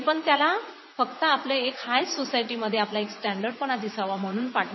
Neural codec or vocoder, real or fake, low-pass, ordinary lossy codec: vocoder, 44.1 kHz, 80 mel bands, Vocos; fake; 7.2 kHz; MP3, 24 kbps